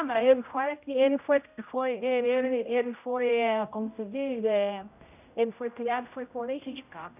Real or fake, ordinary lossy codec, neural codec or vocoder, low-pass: fake; none; codec, 16 kHz, 0.5 kbps, X-Codec, HuBERT features, trained on general audio; 3.6 kHz